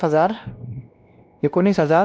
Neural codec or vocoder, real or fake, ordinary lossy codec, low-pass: codec, 16 kHz, 1 kbps, X-Codec, WavLM features, trained on Multilingual LibriSpeech; fake; none; none